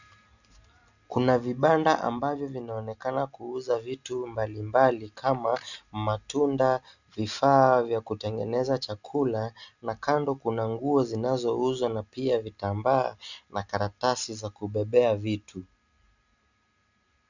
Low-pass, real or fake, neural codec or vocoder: 7.2 kHz; real; none